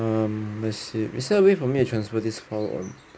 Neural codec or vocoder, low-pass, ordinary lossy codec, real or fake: none; none; none; real